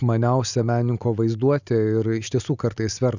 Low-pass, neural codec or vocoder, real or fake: 7.2 kHz; none; real